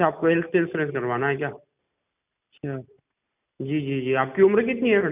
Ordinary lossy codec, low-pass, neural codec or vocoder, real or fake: none; 3.6 kHz; none; real